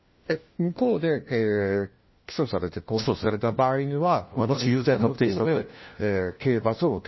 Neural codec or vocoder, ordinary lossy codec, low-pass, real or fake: codec, 16 kHz, 1 kbps, FunCodec, trained on LibriTTS, 50 frames a second; MP3, 24 kbps; 7.2 kHz; fake